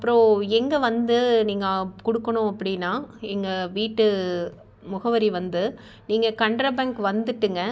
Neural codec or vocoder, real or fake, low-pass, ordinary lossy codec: none; real; none; none